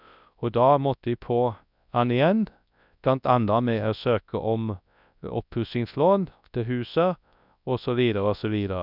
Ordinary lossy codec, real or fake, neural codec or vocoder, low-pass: none; fake; codec, 24 kHz, 0.9 kbps, WavTokenizer, large speech release; 5.4 kHz